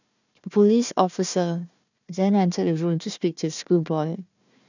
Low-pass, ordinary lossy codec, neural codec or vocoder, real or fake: 7.2 kHz; none; codec, 16 kHz, 1 kbps, FunCodec, trained on Chinese and English, 50 frames a second; fake